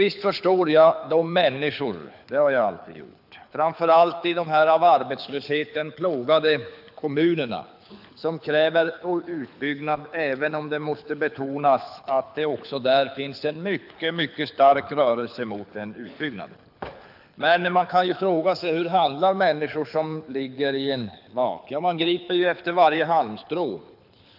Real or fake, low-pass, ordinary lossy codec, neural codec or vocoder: fake; 5.4 kHz; AAC, 48 kbps; codec, 24 kHz, 6 kbps, HILCodec